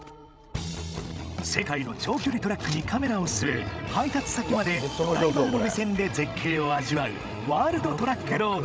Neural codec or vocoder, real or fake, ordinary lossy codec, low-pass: codec, 16 kHz, 16 kbps, FreqCodec, larger model; fake; none; none